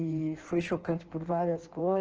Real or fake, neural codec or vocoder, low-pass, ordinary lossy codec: fake; codec, 16 kHz in and 24 kHz out, 1.1 kbps, FireRedTTS-2 codec; 7.2 kHz; Opus, 16 kbps